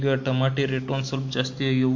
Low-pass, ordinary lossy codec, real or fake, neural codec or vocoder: 7.2 kHz; MP3, 48 kbps; real; none